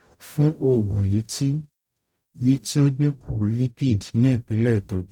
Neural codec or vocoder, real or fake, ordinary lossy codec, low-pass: codec, 44.1 kHz, 0.9 kbps, DAC; fake; none; 19.8 kHz